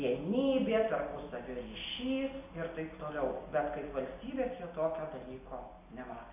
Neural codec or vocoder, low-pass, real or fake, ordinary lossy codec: none; 3.6 kHz; real; AAC, 32 kbps